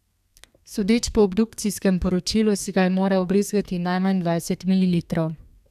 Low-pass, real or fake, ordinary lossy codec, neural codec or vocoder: 14.4 kHz; fake; none; codec, 32 kHz, 1.9 kbps, SNAC